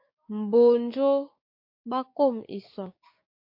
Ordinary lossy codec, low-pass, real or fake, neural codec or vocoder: MP3, 32 kbps; 5.4 kHz; fake; codec, 16 kHz, 6 kbps, DAC